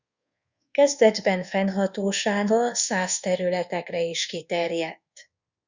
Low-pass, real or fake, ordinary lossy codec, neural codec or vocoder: 7.2 kHz; fake; Opus, 64 kbps; codec, 24 kHz, 1.2 kbps, DualCodec